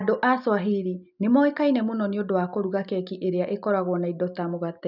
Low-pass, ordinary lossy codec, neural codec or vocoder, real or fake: 5.4 kHz; none; none; real